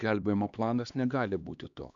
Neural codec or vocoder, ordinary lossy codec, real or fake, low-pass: codec, 16 kHz, 2 kbps, X-Codec, HuBERT features, trained on LibriSpeech; MP3, 96 kbps; fake; 7.2 kHz